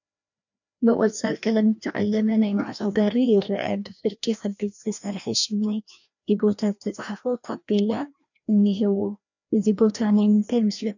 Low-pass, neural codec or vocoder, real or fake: 7.2 kHz; codec, 16 kHz, 1 kbps, FreqCodec, larger model; fake